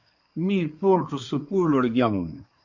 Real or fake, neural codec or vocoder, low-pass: fake; codec, 24 kHz, 1 kbps, SNAC; 7.2 kHz